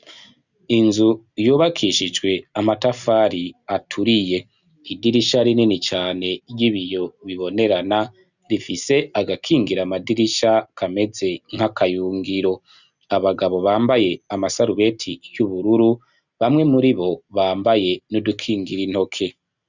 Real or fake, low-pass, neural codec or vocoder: real; 7.2 kHz; none